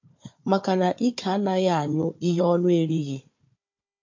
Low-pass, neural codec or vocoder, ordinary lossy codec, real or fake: 7.2 kHz; codec, 16 kHz, 4 kbps, FunCodec, trained on Chinese and English, 50 frames a second; MP3, 48 kbps; fake